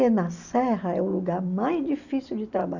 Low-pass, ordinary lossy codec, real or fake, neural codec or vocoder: 7.2 kHz; none; real; none